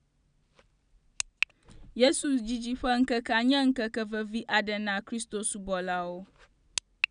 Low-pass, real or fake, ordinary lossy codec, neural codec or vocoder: 9.9 kHz; real; none; none